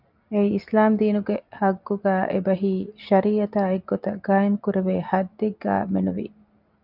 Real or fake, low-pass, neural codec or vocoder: real; 5.4 kHz; none